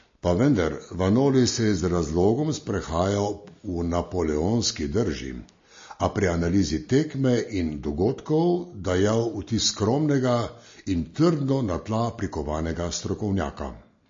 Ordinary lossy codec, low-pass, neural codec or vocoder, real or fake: MP3, 32 kbps; 7.2 kHz; none; real